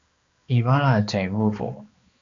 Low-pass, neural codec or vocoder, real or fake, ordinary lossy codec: 7.2 kHz; codec, 16 kHz, 2 kbps, X-Codec, HuBERT features, trained on balanced general audio; fake; MP3, 64 kbps